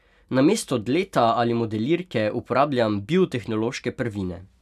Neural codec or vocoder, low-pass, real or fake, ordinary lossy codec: none; 14.4 kHz; real; none